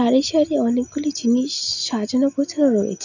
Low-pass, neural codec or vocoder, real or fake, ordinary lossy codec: 7.2 kHz; none; real; none